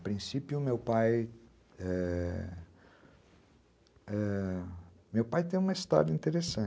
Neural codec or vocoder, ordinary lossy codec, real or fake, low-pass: none; none; real; none